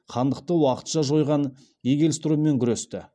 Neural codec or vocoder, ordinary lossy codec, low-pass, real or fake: none; none; none; real